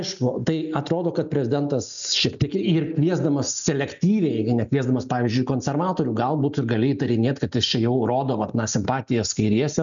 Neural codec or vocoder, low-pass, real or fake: none; 7.2 kHz; real